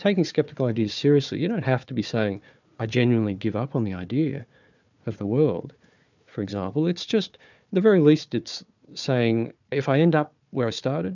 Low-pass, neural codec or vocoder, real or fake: 7.2 kHz; codec, 16 kHz, 4 kbps, FunCodec, trained on Chinese and English, 50 frames a second; fake